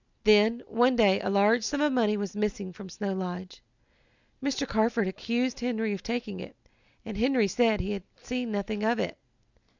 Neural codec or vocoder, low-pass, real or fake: none; 7.2 kHz; real